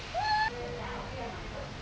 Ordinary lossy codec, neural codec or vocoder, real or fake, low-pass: none; none; real; none